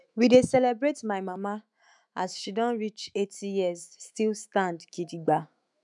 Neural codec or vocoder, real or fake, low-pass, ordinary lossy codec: autoencoder, 48 kHz, 128 numbers a frame, DAC-VAE, trained on Japanese speech; fake; 10.8 kHz; none